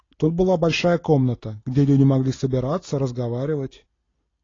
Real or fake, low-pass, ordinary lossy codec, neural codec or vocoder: real; 7.2 kHz; AAC, 32 kbps; none